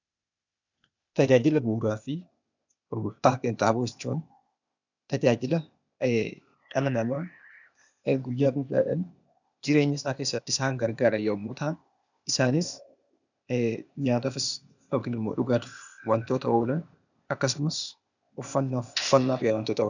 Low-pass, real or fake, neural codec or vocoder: 7.2 kHz; fake; codec, 16 kHz, 0.8 kbps, ZipCodec